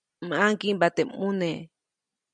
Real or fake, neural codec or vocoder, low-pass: real; none; 9.9 kHz